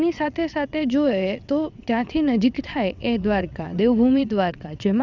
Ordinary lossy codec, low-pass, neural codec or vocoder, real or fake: none; 7.2 kHz; vocoder, 22.05 kHz, 80 mel bands, WaveNeXt; fake